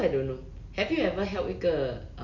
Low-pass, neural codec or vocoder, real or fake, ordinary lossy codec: 7.2 kHz; none; real; none